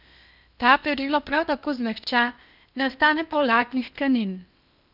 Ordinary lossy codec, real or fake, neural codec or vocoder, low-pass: none; fake; codec, 16 kHz in and 24 kHz out, 0.8 kbps, FocalCodec, streaming, 65536 codes; 5.4 kHz